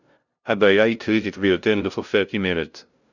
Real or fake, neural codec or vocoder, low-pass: fake; codec, 16 kHz, 0.5 kbps, FunCodec, trained on LibriTTS, 25 frames a second; 7.2 kHz